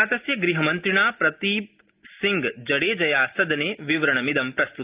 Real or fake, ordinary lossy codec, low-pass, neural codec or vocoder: real; Opus, 32 kbps; 3.6 kHz; none